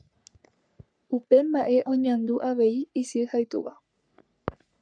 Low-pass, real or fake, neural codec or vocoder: 9.9 kHz; fake; codec, 44.1 kHz, 3.4 kbps, Pupu-Codec